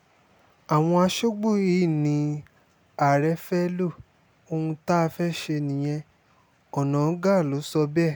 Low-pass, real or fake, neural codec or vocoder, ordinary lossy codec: none; real; none; none